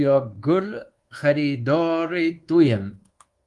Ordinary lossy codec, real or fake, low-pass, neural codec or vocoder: Opus, 24 kbps; fake; 10.8 kHz; codec, 24 kHz, 1.2 kbps, DualCodec